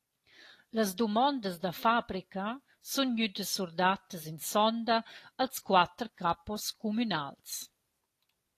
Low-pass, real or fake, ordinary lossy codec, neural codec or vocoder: 14.4 kHz; real; AAC, 48 kbps; none